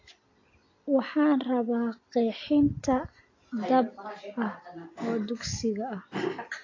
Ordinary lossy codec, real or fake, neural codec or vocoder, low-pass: AAC, 32 kbps; real; none; 7.2 kHz